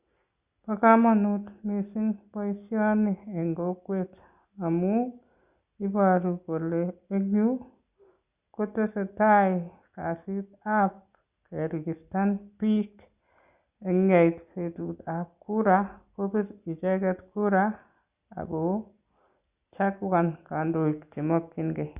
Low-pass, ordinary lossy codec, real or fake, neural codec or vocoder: 3.6 kHz; Opus, 64 kbps; real; none